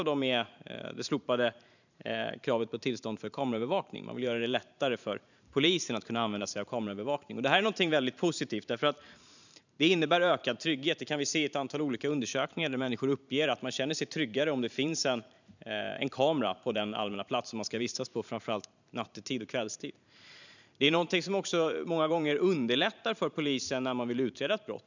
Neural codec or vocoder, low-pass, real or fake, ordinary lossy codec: vocoder, 44.1 kHz, 128 mel bands every 512 samples, BigVGAN v2; 7.2 kHz; fake; none